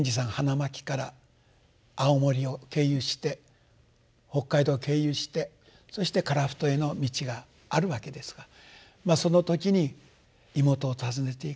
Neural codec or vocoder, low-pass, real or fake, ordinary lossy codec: none; none; real; none